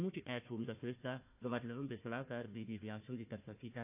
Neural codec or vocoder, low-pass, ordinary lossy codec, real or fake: codec, 16 kHz, 1 kbps, FunCodec, trained on Chinese and English, 50 frames a second; 3.6 kHz; AAC, 32 kbps; fake